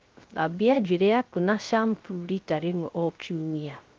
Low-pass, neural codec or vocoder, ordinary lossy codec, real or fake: 7.2 kHz; codec, 16 kHz, 0.3 kbps, FocalCodec; Opus, 32 kbps; fake